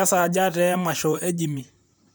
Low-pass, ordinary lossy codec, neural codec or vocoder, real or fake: none; none; vocoder, 44.1 kHz, 128 mel bands, Pupu-Vocoder; fake